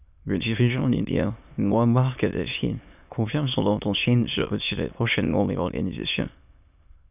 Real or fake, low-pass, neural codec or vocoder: fake; 3.6 kHz; autoencoder, 22.05 kHz, a latent of 192 numbers a frame, VITS, trained on many speakers